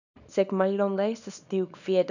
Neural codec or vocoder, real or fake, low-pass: codec, 24 kHz, 0.9 kbps, WavTokenizer, small release; fake; 7.2 kHz